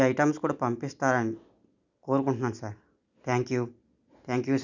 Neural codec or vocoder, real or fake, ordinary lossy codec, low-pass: none; real; none; 7.2 kHz